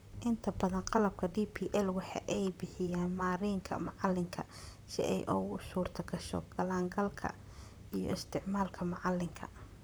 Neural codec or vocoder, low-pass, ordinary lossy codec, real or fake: vocoder, 44.1 kHz, 128 mel bands, Pupu-Vocoder; none; none; fake